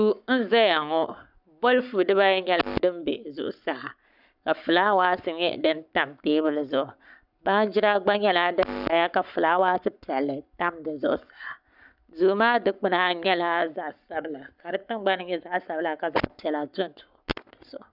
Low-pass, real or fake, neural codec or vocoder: 5.4 kHz; fake; codec, 44.1 kHz, 7.8 kbps, Pupu-Codec